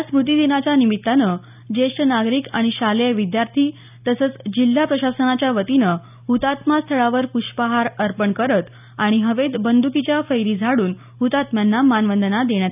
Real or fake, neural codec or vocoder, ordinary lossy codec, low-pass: real; none; none; 3.6 kHz